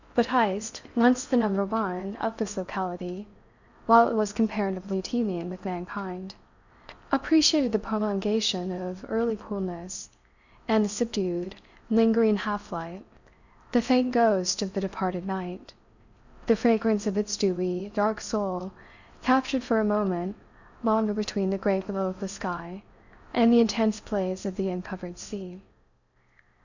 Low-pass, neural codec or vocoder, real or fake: 7.2 kHz; codec, 16 kHz in and 24 kHz out, 0.6 kbps, FocalCodec, streaming, 4096 codes; fake